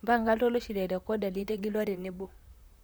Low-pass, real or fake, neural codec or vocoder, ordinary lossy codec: none; fake; vocoder, 44.1 kHz, 128 mel bands, Pupu-Vocoder; none